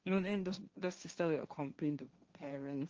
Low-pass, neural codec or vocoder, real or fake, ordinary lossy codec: 7.2 kHz; codec, 16 kHz, 1.1 kbps, Voila-Tokenizer; fake; Opus, 24 kbps